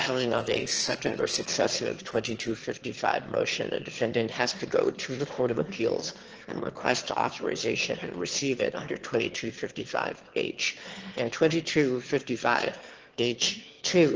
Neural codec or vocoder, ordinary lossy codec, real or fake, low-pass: autoencoder, 22.05 kHz, a latent of 192 numbers a frame, VITS, trained on one speaker; Opus, 16 kbps; fake; 7.2 kHz